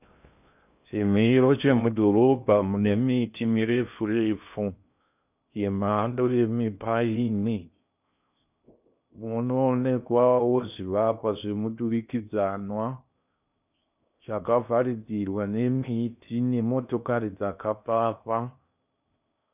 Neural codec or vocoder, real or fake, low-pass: codec, 16 kHz in and 24 kHz out, 0.6 kbps, FocalCodec, streaming, 2048 codes; fake; 3.6 kHz